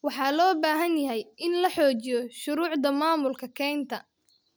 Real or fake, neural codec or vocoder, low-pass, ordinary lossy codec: real; none; none; none